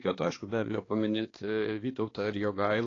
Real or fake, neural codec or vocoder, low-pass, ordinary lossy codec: fake; codec, 16 kHz, 2 kbps, X-Codec, HuBERT features, trained on balanced general audio; 7.2 kHz; AAC, 32 kbps